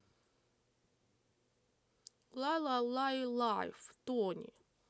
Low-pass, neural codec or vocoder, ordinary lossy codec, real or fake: none; none; none; real